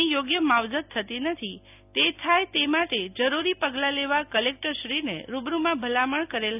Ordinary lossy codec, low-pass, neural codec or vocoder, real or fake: none; 3.6 kHz; none; real